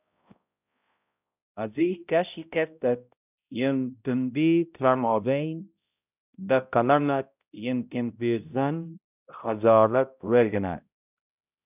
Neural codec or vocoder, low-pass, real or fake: codec, 16 kHz, 0.5 kbps, X-Codec, HuBERT features, trained on balanced general audio; 3.6 kHz; fake